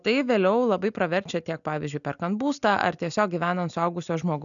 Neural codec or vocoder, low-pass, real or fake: none; 7.2 kHz; real